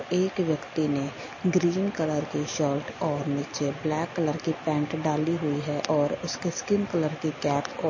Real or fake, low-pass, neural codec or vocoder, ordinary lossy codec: real; 7.2 kHz; none; MP3, 32 kbps